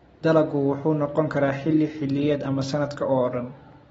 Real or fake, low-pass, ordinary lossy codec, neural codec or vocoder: real; 14.4 kHz; AAC, 24 kbps; none